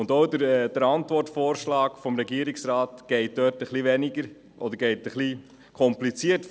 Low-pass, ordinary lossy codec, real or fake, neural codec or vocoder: none; none; real; none